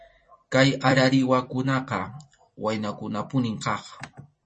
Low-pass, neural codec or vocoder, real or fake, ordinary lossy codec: 10.8 kHz; vocoder, 44.1 kHz, 128 mel bands every 256 samples, BigVGAN v2; fake; MP3, 32 kbps